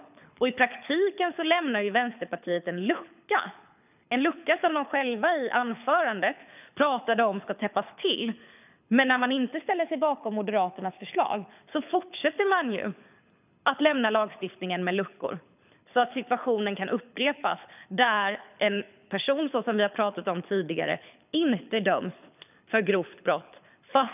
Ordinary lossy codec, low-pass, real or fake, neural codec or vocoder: none; 3.6 kHz; fake; codec, 24 kHz, 6 kbps, HILCodec